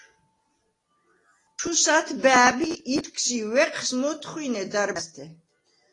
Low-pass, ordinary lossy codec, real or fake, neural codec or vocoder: 10.8 kHz; AAC, 32 kbps; real; none